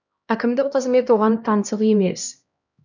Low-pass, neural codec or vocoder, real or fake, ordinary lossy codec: 7.2 kHz; codec, 16 kHz, 1 kbps, X-Codec, HuBERT features, trained on LibriSpeech; fake; none